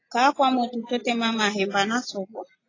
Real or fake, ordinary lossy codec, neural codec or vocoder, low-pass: real; AAC, 32 kbps; none; 7.2 kHz